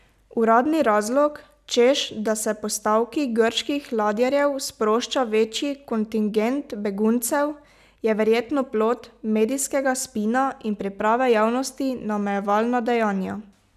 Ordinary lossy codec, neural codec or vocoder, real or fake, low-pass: none; none; real; 14.4 kHz